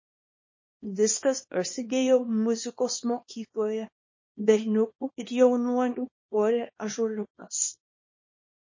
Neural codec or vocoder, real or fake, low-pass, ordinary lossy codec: codec, 24 kHz, 0.9 kbps, WavTokenizer, small release; fake; 7.2 kHz; MP3, 32 kbps